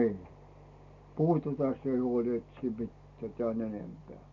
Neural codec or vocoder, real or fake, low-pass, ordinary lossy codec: none; real; 7.2 kHz; AAC, 64 kbps